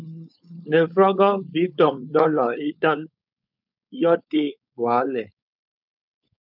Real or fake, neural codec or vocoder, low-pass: fake; codec, 16 kHz, 4.8 kbps, FACodec; 5.4 kHz